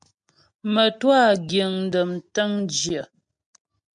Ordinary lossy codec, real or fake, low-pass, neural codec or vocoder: MP3, 64 kbps; real; 9.9 kHz; none